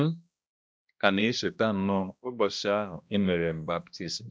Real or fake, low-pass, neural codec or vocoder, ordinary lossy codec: fake; none; codec, 16 kHz, 1 kbps, X-Codec, HuBERT features, trained on balanced general audio; none